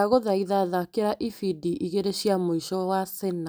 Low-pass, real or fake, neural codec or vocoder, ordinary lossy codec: none; real; none; none